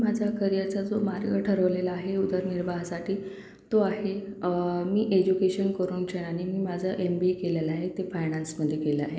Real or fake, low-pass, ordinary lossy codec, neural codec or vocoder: real; none; none; none